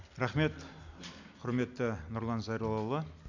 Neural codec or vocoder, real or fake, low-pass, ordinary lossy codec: none; real; 7.2 kHz; none